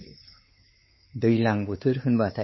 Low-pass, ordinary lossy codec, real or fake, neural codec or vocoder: 7.2 kHz; MP3, 24 kbps; fake; codec, 16 kHz, 4 kbps, X-Codec, WavLM features, trained on Multilingual LibriSpeech